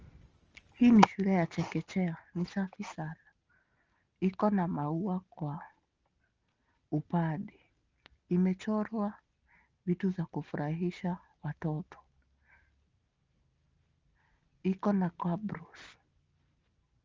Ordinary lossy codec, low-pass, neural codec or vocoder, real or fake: Opus, 24 kbps; 7.2 kHz; none; real